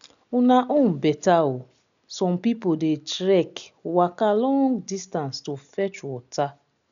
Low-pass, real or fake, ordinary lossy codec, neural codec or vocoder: 7.2 kHz; real; none; none